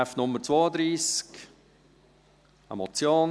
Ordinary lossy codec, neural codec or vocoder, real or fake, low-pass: none; none; real; none